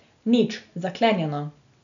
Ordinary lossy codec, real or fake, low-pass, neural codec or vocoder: none; real; 7.2 kHz; none